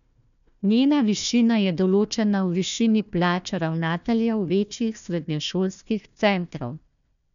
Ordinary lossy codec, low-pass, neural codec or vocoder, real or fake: none; 7.2 kHz; codec, 16 kHz, 1 kbps, FunCodec, trained on Chinese and English, 50 frames a second; fake